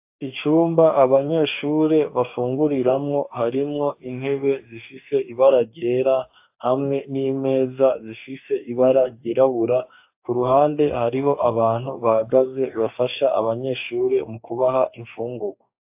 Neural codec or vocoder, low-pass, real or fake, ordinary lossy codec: codec, 44.1 kHz, 2.6 kbps, DAC; 3.6 kHz; fake; AAC, 32 kbps